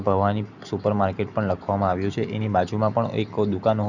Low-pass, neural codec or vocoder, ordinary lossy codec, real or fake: 7.2 kHz; none; none; real